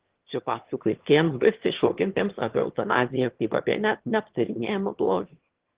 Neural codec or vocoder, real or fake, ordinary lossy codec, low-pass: autoencoder, 22.05 kHz, a latent of 192 numbers a frame, VITS, trained on one speaker; fake; Opus, 16 kbps; 3.6 kHz